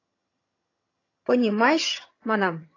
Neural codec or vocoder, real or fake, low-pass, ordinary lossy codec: vocoder, 22.05 kHz, 80 mel bands, HiFi-GAN; fake; 7.2 kHz; AAC, 32 kbps